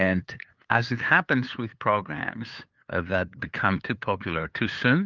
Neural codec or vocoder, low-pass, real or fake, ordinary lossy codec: codec, 16 kHz, 2 kbps, FunCodec, trained on LibriTTS, 25 frames a second; 7.2 kHz; fake; Opus, 32 kbps